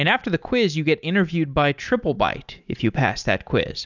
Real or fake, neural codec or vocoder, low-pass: real; none; 7.2 kHz